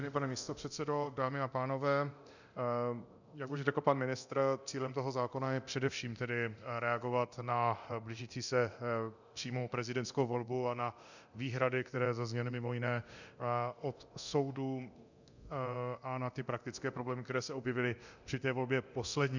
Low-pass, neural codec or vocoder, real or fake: 7.2 kHz; codec, 24 kHz, 0.9 kbps, DualCodec; fake